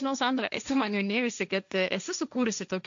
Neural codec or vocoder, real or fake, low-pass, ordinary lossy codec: codec, 16 kHz, 1.1 kbps, Voila-Tokenizer; fake; 7.2 kHz; MP3, 64 kbps